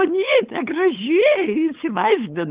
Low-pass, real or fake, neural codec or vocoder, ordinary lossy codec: 3.6 kHz; fake; codec, 16 kHz, 8 kbps, FunCodec, trained on LibriTTS, 25 frames a second; Opus, 32 kbps